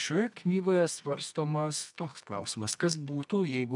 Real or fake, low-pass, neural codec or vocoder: fake; 10.8 kHz; codec, 24 kHz, 0.9 kbps, WavTokenizer, medium music audio release